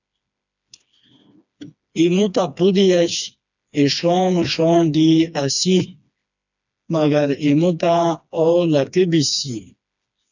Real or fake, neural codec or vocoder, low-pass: fake; codec, 16 kHz, 2 kbps, FreqCodec, smaller model; 7.2 kHz